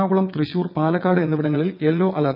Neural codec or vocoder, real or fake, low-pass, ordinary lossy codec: vocoder, 22.05 kHz, 80 mel bands, WaveNeXt; fake; 5.4 kHz; none